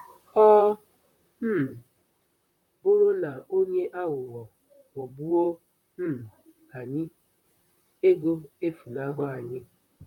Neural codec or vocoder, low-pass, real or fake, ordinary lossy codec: vocoder, 44.1 kHz, 128 mel bands, Pupu-Vocoder; 19.8 kHz; fake; none